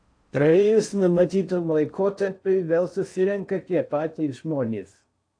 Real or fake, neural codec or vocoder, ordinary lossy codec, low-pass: fake; codec, 16 kHz in and 24 kHz out, 0.6 kbps, FocalCodec, streaming, 4096 codes; AAC, 48 kbps; 9.9 kHz